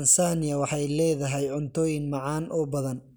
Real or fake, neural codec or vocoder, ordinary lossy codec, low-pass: real; none; none; none